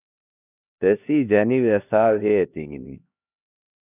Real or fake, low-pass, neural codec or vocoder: fake; 3.6 kHz; codec, 16 kHz, 1 kbps, X-Codec, HuBERT features, trained on LibriSpeech